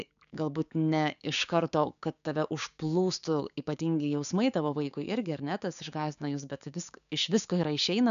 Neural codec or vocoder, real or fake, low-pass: codec, 16 kHz, 6 kbps, DAC; fake; 7.2 kHz